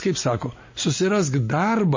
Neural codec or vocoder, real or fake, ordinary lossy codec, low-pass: none; real; MP3, 32 kbps; 7.2 kHz